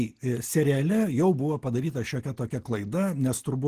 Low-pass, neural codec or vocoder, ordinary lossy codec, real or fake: 14.4 kHz; none; Opus, 16 kbps; real